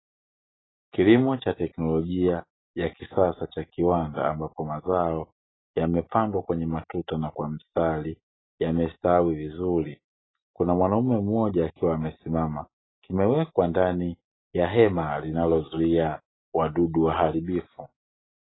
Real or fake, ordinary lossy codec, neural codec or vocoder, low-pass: real; AAC, 16 kbps; none; 7.2 kHz